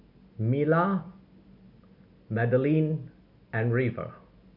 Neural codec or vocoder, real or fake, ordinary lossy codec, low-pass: none; real; none; 5.4 kHz